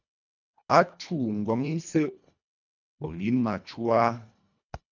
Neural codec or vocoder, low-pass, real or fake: codec, 24 kHz, 1.5 kbps, HILCodec; 7.2 kHz; fake